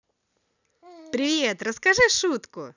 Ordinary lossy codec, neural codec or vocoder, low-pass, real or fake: none; none; 7.2 kHz; real